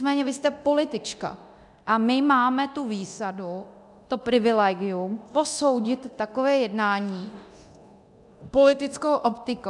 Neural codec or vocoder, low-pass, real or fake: codec, 24 kHz, 0.9 kbps, DualCodec; 10.8 kHz; fake